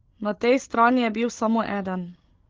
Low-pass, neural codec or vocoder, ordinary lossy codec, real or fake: 7.2 kHz; codec, 16 kHz, 16 kbps, FreqCodec, larger model; Opus, 16 kbps; fake